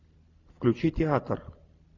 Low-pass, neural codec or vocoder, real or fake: 7.2 kHz; none; real